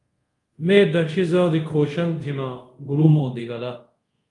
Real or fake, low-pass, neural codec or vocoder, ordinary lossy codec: fake; 10.8 kHz; codec, 24 kHz, 0.5 kbps, DualCodec; Opus, 32 kbps